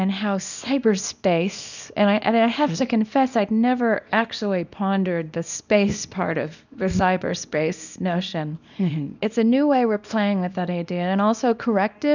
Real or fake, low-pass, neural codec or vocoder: fake; 7.2 kHz; codec, 24 kHz, 0.9 kbps, WavTokenizer, small release